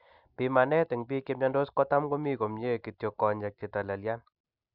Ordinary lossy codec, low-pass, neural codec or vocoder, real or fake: none; 5.4 kHz; none; real